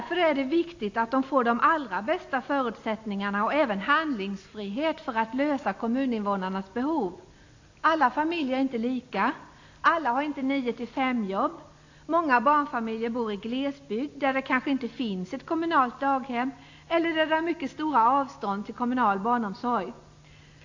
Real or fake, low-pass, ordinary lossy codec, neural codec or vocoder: real; 7.2 kHz; AAC, 48 kbps; none